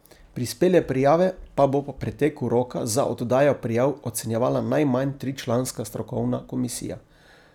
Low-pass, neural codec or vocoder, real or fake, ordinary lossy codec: 19.8 kHz; none; real; none